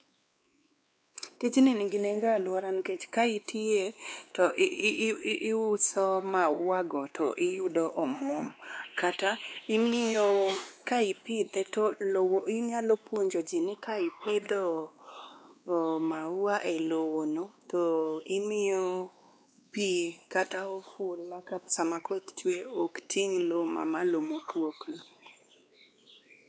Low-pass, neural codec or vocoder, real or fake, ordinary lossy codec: none; codec, 16 kHz, 2 kbps, X-Codec, WavLM features, trained on Multilingual LibriSpeech; fake; none